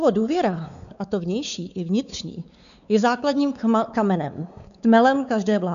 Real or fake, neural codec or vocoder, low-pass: fake; codec, 16 kHz, 4 kbps, X-Codec, WavLM features, trained on Multilingual LibriSpeech; 7.2 kHz